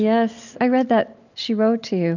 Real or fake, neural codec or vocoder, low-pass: real; none; 7.2 kHz